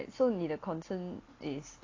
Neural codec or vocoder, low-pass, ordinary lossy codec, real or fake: none; 7.2 kHz; AAC, 32 kbps; real